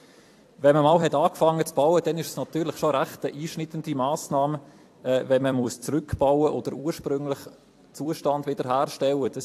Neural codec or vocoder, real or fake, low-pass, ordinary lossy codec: vocoder, 44.1 kHz, 128 mel bands every 256 samples, BigVGAN v2; fake; 14.4 kHz; AAC, 64 kbps